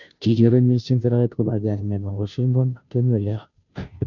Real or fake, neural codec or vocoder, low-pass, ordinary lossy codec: fake; codec, 16 kHz, 0.5 kbps, FunCodec, trained on Chinese and English, 25 frames a second; 7.2 kHz; none